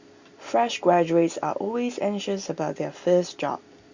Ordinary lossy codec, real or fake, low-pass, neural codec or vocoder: Opus, 64 kbps; real; 7.2 kHz; none